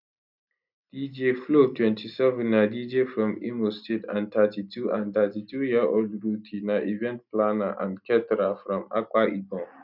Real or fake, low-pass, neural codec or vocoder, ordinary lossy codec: real; 5.4 kHz; none; none